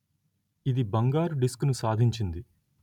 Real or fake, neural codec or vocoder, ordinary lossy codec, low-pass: real; none; none; 19.8 kHz